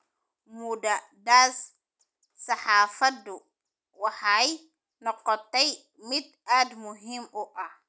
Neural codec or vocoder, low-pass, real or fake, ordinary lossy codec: none; none; real; none